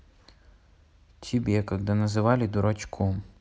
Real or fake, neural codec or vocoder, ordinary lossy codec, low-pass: real; none; none; none